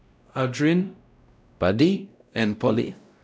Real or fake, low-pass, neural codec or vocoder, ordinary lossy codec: fake; none; codec, 16 kHz, 0.5 kbps, X-Codec, WavLM features, trained on Multilingual LibriSpeech; none